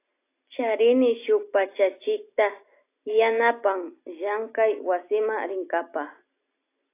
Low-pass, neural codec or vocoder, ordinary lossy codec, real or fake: 3.6 kHz; none; AAC, 24 kbps; real